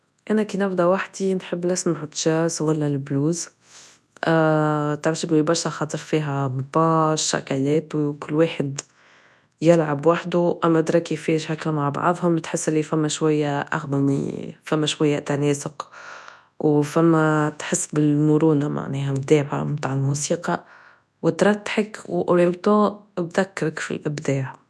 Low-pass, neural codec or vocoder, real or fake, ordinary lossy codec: none; codec, 24 kHz, 0.9 kbps, WavTokenizer, large speech release; fake; none